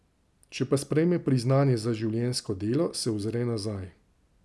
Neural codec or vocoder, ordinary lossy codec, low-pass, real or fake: none; none; none; real